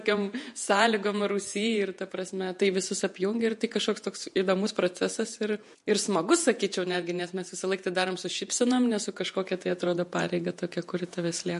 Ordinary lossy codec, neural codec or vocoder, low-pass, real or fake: MP3, 48 kbps; vocoder, 48 kHz, 128 mel bands, Vocos; 14.4 kHz; fake